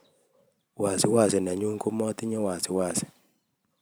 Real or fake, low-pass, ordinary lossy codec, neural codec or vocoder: fake; none; none; vocoder, 44.1 kHz, 128 mel bands every 512 samples, BigVGAN v2